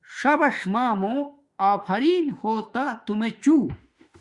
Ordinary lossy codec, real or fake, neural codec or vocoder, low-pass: Opus, 64 kbps; fake; autoencoder, 48 kHz, 32 numbers a frame, DAC-VAE, trained on Japanese speech; 10.8 kHz